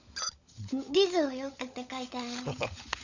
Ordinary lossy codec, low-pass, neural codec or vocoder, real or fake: none; 7.2 kHz; codec, 16 kHz, 16 kbps, FunCodec, trained on LibriTTS, 50 frames a second; fake